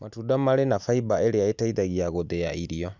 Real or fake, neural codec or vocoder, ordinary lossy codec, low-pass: real; none; none; 7.2 kHz